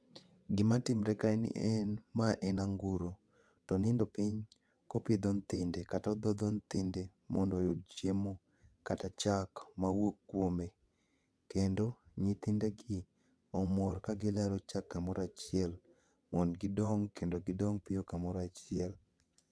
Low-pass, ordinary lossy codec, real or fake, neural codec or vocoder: none; none; fake; vocoder, 22.05 kHz, 80 mel bands, WaveNeXt